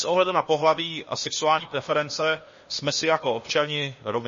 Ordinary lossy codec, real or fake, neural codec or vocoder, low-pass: MP3, 32 kbps; fake; codec, 16 kHz, 0.8 kbps, ZipCodec; 7.2 kHz